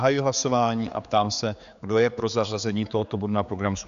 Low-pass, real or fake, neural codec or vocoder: 7.2 kHz; fake; codec, 16 kHz, 4 kbps, X-Codec, HuBERT features, trained on general audio